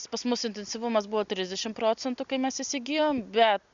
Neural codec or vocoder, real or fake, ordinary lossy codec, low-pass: none; real; Opus, 64 kbps; 7.2 kHz